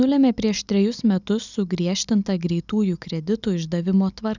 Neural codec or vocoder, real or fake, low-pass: none; real; 7.2 kHz